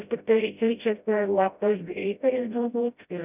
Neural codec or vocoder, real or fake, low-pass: codec, 16 kHz, 0.5 kbps, FreqCodec, smaller model; fake; 3.6 kHz